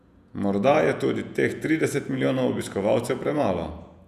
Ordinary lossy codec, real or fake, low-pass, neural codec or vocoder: none; fake; 14.4 kHz; vocoder, 48 kHz, 128 mel bands, Vocos